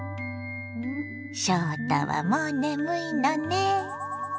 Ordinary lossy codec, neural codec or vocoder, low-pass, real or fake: none; none; none; real